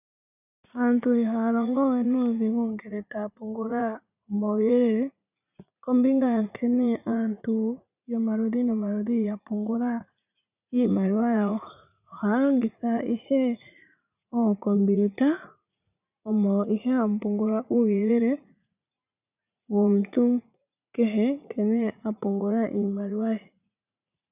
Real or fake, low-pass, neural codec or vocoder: fake; 3.6 kHz; vocoder, 44.1 kHz, 80 mel bands, Vocos